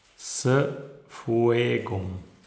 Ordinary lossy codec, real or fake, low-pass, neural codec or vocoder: none; real; none; none